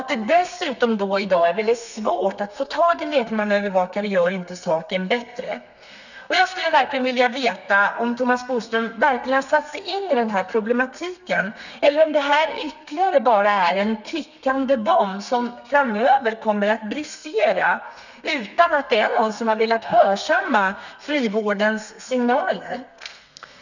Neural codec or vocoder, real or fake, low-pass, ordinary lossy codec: codec, 32 kHz, 1.9 kbps, SNAC; fake; 7.2 kHz; none